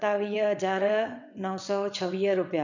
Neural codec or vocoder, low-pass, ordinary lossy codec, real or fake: vocoder, 22.05 kHz, 80 mel bands, WaveNeXt; 7.2 kHz; none; fake